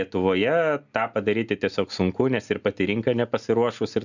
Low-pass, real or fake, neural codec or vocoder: 7.2 kHz; real; none